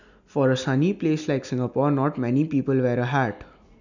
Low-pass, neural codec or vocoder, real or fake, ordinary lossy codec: 7.2 kHz; none; real; none